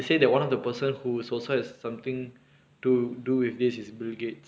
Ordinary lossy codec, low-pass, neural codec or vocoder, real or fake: none; none; none; real